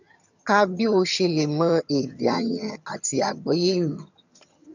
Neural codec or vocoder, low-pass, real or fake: vocoder, 22.05 kHz, 80 mel bands, HiFi-GAN; 7.2 kHz; fake